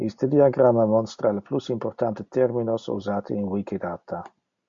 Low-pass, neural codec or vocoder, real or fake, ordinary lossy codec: 7.2 kHz; none; real; MP3, 48 kbps